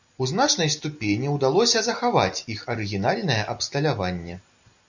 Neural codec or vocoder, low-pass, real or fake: none; 7.2 kHz; real